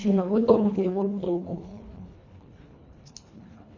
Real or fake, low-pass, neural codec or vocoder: fake; 7.2 kHz; codec, 24 kHz, 1.5 kbps, HILCodec